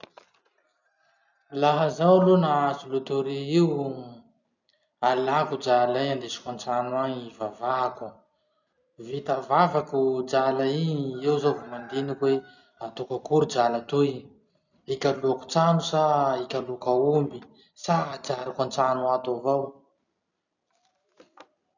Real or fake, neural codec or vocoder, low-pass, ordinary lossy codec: real; none; 7.2 kHz; none